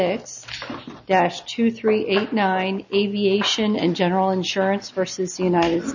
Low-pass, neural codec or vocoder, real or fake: 7.2 kHz; none; real